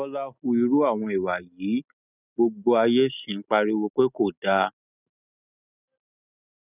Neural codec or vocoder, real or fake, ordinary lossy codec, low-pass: none; real; none; 3.6 kHz